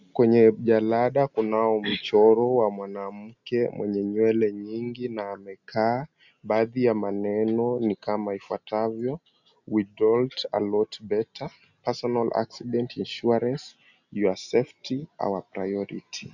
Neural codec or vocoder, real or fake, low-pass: none; real; 7.2 kHz